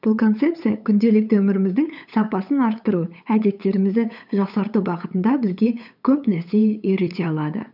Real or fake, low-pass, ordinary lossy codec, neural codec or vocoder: fake; 5.4 kHz; none; codec, 16 kHz, 8 kbps, FunCodec, trained on LibriTTS, 25 frames a second